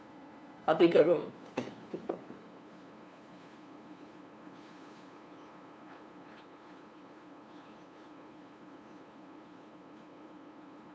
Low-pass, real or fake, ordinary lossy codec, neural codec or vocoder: none; fake; none; codec, 16 kHz, 2 kbps, FunCodec, trained on LibriTTS, 25 frames a second